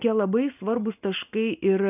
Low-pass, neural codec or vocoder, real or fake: 3.6 kHz; none; real